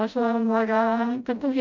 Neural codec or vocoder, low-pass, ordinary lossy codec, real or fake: codec, 16 kHz, 0.5 kbps, FreqCodec, smaller model; 7.2 kHz; none; fake